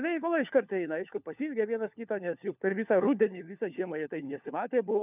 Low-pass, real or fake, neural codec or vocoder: 3.6 kHz; fake; codec, 16 kHz, 4 kbps, FunCodec, trained on LibriTTS, 50 frames a second